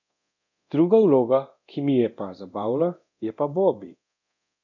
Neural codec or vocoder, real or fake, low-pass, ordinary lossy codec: codec, 24 kHz, 0.9 kbps, DualCodec; fake; 7.2 kHz; none